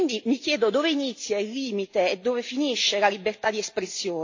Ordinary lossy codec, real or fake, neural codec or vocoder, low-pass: AAC, 48 kbps; real; none; 7.2 kHz